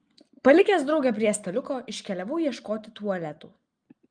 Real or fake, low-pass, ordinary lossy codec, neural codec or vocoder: real; 9.9 kHz; Opus, 32 kbps; none